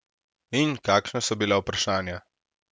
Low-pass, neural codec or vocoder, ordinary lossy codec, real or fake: none; none; none; real